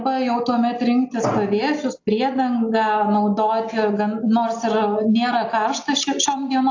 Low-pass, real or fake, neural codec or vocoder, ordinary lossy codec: 7.2 kHz; real; none; AAC, 48 kbps